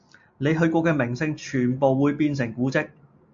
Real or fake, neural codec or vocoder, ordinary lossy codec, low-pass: real; none; MP3, 48 kbps; 7.2 kHz